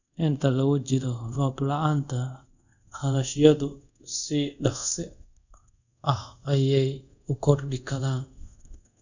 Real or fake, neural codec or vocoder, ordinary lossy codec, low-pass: fake; codec, 24 kHz, 0.5 kbps, DualCodec; none; 7.2 kHz